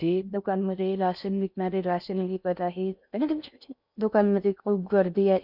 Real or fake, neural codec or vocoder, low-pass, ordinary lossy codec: fake; codec, 16 kHz in and 24 kHz out, 0.6 kbps, FocalCodec, streaming, 4096 codes; 5.4 kHz; none